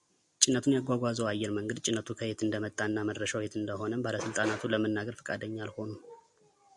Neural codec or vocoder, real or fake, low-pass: none; real; 10.8 kHz